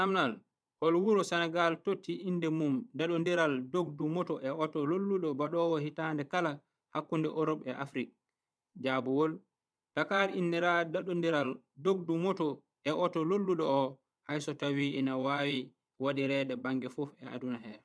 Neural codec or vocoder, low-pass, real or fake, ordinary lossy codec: vocoder, 22.05 kHz, 80 mel bands, Vocos; none; fake; none